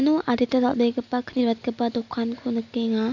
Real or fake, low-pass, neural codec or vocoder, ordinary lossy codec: real; 7.2 kHz; none; none